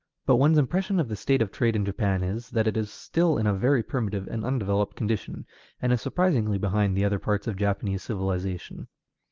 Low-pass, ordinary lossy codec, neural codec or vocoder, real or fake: 7.2 kHz; Opus, 24 kbps; none; real